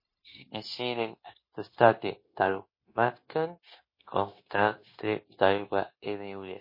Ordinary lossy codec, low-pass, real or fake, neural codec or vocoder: MP3, 24 kbps; 5.4 kHz; fake; codec, 16 kHz, 0.9 kbps, LongCat-Audio-Codec